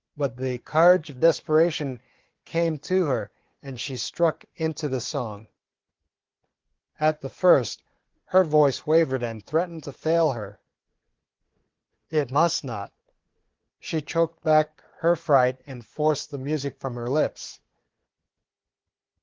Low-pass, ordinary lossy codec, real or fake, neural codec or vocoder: 7.2 kHz; Opus, 16 kbps; fake; codec, 16 kHz, 4 kbps, FunCodec, trained on Chinese and English, 50 frames a second